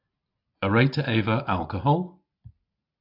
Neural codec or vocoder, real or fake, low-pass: none; real; 5.4 kHz